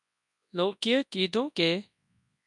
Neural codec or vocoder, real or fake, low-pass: codec, 24 kHz, 0.9 kbps, WavTokenizer, large speech release; fake; 10.8 kHz